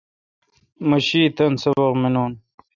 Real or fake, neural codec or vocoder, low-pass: real; none; 7.2 kHz